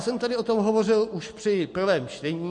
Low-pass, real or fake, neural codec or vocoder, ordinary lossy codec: 10.8 kHz; real; none; MP3, 48 kbps